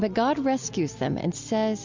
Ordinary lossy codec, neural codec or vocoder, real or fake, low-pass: MP3, 64 kbps; none; real; 7.2 kHz